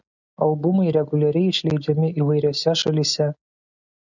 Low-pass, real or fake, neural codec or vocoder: 7.2 kHz; real; none